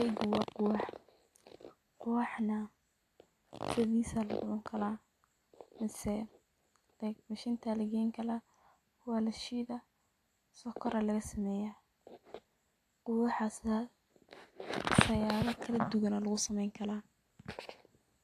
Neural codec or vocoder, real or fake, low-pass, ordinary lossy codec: none; real; 14.4 kHz; AAC, 64 kbps